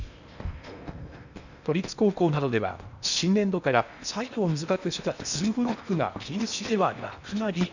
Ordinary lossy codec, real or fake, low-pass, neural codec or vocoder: none; fake; 7.2 kHz; codec, 16 kHz in and 24 kHz out, 0.8 kbps, FocalCodec, streaming, 65536 codes